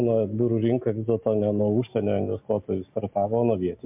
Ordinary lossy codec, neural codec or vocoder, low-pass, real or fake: MP3, 32 kbps; none; 3.6 kHz; real